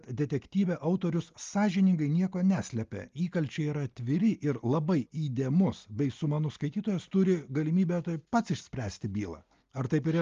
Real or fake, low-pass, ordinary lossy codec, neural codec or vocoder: real; 7.2 kHz; Opus, 32 kbps; none